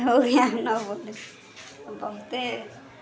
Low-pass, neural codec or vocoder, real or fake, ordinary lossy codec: none; none; real; none